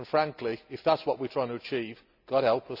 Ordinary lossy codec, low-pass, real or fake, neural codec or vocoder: none; 5.4 kHz; real; none